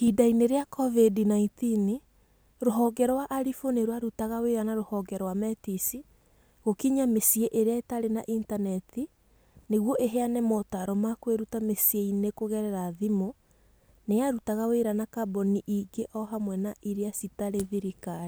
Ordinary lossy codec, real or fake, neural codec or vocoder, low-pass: none; real; none; none